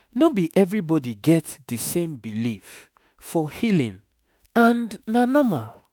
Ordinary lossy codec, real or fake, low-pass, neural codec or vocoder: none; fake; none; autoencoder, 48 kHz, 32 numbers a frame, DAC-VAE, trained on Japanese speech